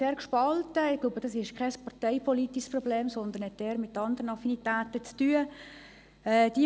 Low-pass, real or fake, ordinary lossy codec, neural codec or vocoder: none; real; none; none